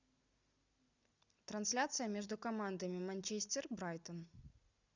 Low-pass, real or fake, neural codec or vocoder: 7.2 kHz; real; none